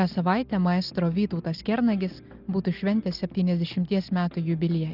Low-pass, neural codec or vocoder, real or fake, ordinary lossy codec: 5.4 kHz; none; real; Opus, 24 kbps